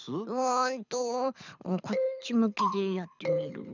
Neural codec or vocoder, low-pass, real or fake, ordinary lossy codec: codec, 24 kHz, 6 kbps, HILCodec; 7.2 kHz; fake; none